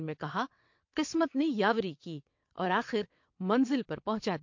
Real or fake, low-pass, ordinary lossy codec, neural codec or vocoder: fake; 7.2 kHz; MP3, 48 kbps; vocoder, 22.05 kHz, 80 mel bands, WaveNeXt